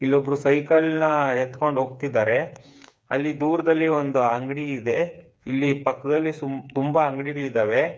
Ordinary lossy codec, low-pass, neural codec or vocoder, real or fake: none; none; codec, 16 kHz, 4 kbps, FreqCodec, smaller model; fake